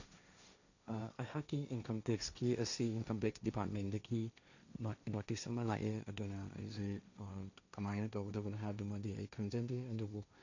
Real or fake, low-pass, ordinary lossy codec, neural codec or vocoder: fake; 7.2 kHz; none; codec, 16 kHz, 1.1 kbps, Voila-Tokenizer